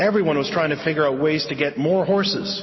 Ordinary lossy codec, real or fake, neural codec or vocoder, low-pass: MP3, 24 kbps; real; none; 7.2 kHz